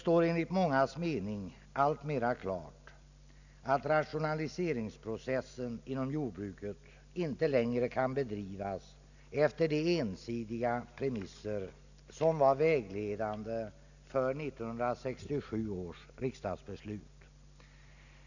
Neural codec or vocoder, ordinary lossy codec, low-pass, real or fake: none; none; 7.2 kHz; real